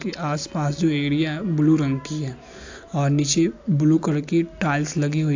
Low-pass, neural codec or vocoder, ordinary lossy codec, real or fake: 7.2 kHz; none; AAC, 48 kbps; real